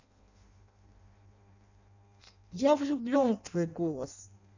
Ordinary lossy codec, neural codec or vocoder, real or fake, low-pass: none; codec, 16 kHz in and 24 kHz out, 0.6 kbps, FireRedTTS-2 codec; fake; 7.2 kHz